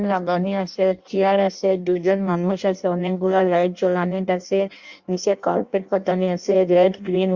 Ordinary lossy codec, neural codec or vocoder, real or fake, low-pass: Opus, 64 kbps; codec, 16 kHz in and 24 kHz out, 0.6 kbps, FireRedTTS-2 codec; fake; 7.2 kHz